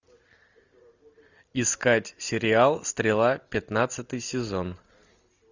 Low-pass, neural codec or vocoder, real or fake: 7.2 kHz; none; real